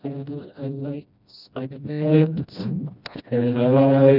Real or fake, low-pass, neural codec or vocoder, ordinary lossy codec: fake; 5.4 kHz; codec, 16 kHz, 1 kbps, FreqCodec, smaller model; none